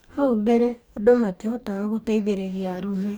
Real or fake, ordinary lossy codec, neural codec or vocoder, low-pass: fake; none; codec, 44.1 kHz, 2.6 kbps, DAC; none